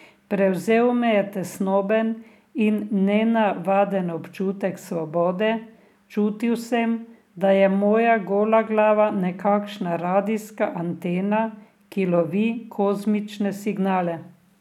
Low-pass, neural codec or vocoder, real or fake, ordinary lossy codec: 19.8 kHz; none; real; none